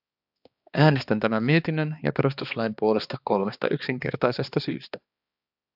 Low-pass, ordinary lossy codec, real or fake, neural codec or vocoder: 5.4 kHz; AAC, 48 kbps; fake; codec, 16 kHz, 2 kbps, X-Codec, HuBERT features, trained on balanced general audio